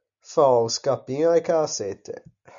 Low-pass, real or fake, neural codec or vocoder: 7.2 kHz; real; none